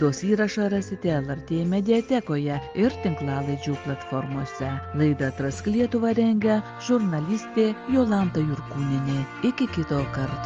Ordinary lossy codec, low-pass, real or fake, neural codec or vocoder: Opus, 24 kbps; 7.2 kHz; real; none